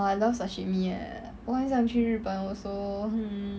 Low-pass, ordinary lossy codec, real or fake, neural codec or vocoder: none; none; real; none